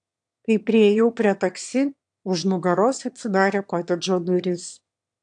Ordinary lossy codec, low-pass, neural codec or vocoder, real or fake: AAC, 64 kbps; 9.9 kHz; autoencoder, 22.05 kHz, a latent of 192 numbers a frame, VITS, trained on one speaker; fake